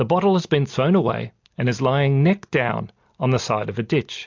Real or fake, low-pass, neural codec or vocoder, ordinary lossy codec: real; 7.2 kHz; none; MP3, 64 kbps